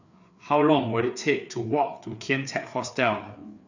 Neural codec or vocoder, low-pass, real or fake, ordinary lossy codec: codec, 16 kHz, 4 kbps, FreqCodec, larger model; 7.2 kHz; fake; none